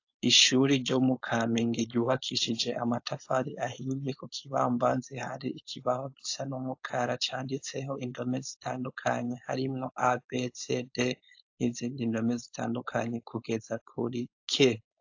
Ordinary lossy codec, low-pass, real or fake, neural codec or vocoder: AAC, 48 kbps; 7.2 kHz; fake; codec, 16 kHz, 4.8 kbps, FACodec